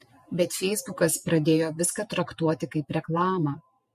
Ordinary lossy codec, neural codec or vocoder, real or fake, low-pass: MP3, 64 kbps; vocoder, 48 kHz, 128 mel bands, Vocos; fake; 14.4 kHz